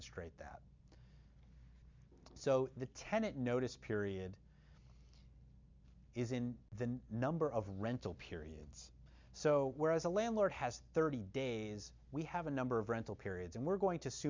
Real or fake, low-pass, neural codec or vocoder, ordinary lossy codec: real; 7.2 kHz; none; MP3, 64 kbps